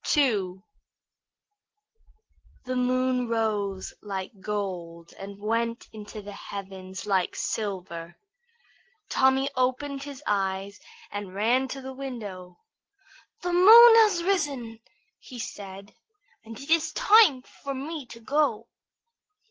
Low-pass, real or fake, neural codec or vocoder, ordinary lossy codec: 7.2 kHz; real; none; Opus, 16 kbps